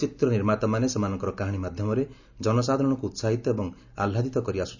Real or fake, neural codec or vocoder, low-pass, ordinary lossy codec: real; none; 7.2 kHz; none